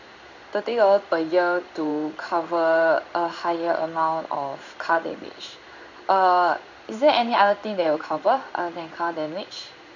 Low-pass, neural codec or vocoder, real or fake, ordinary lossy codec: 7.2 kHz; codec, 16 kHz in and 24 kHz out, 1 kbps, XY-Tokenizer; fake; none